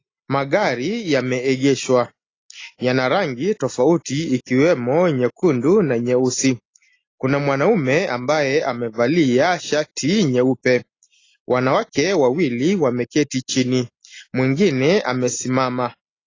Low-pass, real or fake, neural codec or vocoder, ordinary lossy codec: 7.2 kHz; real; none; AAC, 32 kbps